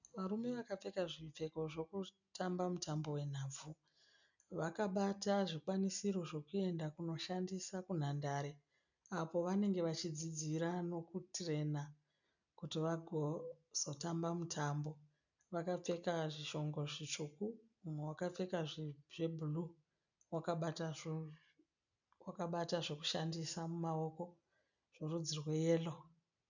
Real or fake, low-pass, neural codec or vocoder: real; 7.2 kHz; none